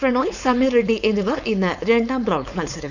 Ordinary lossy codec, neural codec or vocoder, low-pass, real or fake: none; codec, 16 kHz, 4.8 kbps, FACodec; 7.2 kHz; fake